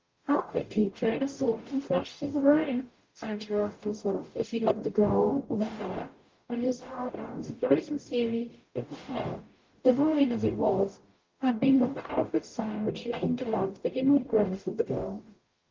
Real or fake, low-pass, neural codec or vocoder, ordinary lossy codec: fake; 7.2 kHz; codec, 44.1 kHz, 0.9 kbps, DAC; Opus, 32 kbps